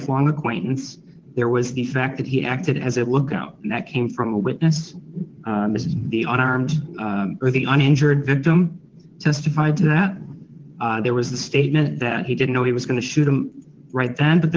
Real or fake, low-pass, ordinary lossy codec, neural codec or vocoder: fake; 7.2 kHz; Opus, 16 kbps; vocoder, 22.05 kHz, 80 mel bands, WaveNeXt